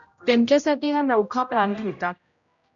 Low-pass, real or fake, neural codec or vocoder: 7.2 kHz; fake; codec, 16 kHz, 0.5 kbps, X-Codec, HuBERT features, trained on general audio